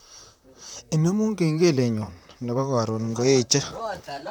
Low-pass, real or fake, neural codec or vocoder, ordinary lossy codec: none; fake; vocoder, 44.1 kHz, 128 mel bands, Pupu-Vocoder; none